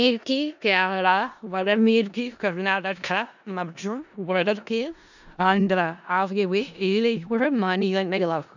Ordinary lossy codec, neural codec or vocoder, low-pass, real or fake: none; codec, 16 kHz in and 24 kHz out, 0.4 kbps, LongCat-Audio-Codec, four codebook decoder; 7.2 kHz; fake